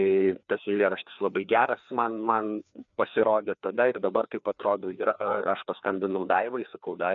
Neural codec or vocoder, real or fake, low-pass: codec, 16 kHz, 2 kbps, FreqCodec, larger model; fake; 7.2 kHz